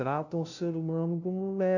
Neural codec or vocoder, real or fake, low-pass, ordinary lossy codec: codec, 16 kHz, 0.5 kbps, FunCodec, trained on LibriTTS, 25 frames a second; fake; 7.2 kHz; none